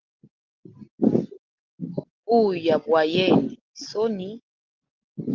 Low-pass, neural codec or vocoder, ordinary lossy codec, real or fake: 7.2 kHz; none; Opus, 16 kbps; real